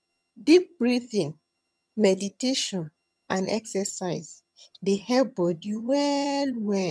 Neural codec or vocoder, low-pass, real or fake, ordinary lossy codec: vocoder, 22.05 kHz, 80 mel bands, HiFi-GAN; none; fake; none